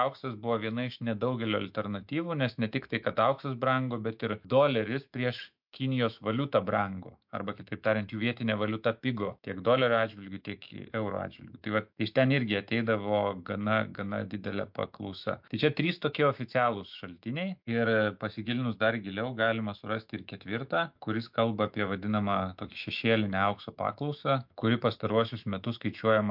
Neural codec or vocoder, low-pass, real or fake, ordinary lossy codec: autoencoder, 48 kHz, 128 numbers a frame, DAC-VAE, trained on Japanese speech; 5.4 kHz; fake; MP3, 48 kbps